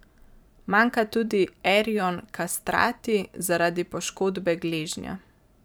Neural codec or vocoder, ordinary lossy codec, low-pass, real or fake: vocoder, 44.1 kHz, 128 mel bands every 512 samples, BigVGAN v2; none; none; fake